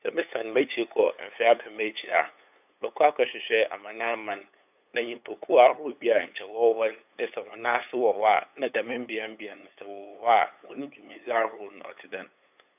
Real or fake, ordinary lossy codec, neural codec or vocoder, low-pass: fake; none; codec, 16 kHz, 8 kbps, FunCodec, trained on LibriTTS, 25 frames a second; 3.6 kHz